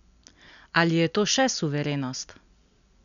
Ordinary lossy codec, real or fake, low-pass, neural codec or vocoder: none; real; 7.2 kHz; none